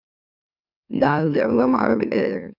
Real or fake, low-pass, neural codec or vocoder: fake; 5.4 kHz; autoencoder, 44.1 kHz, a latent of 192 numbers a frame, MeloTTS